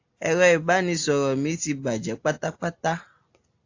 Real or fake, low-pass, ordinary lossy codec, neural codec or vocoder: real; 7.2 kHz; AAC, 48 kbps; none